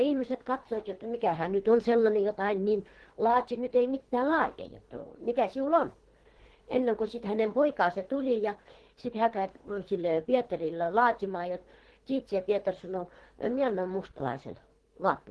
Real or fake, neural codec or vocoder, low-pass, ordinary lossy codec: fake; codec, 24 kHz, 3 kbps, HILCodec; 10.8 kHz; Opus, 16 kbps